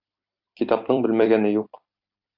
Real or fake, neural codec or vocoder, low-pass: fake; vocoder, 44.1 kHz, 128 mel bands every 256 samples, BigVGAN v2; 5.4 kHz